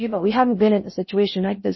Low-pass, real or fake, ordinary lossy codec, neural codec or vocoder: 7.2 kHz; fake; MP3, 24 kbps; codec, 16 kHz in and 24 kHz out, 0.6 kbps, FocalCodec, streaming, 4096 codes